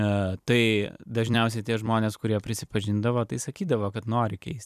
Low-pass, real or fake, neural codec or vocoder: 14.4 kHz; fake; vocoder, 44.1 kHz, 128 mel bands every 256 samples, BigVGAN v2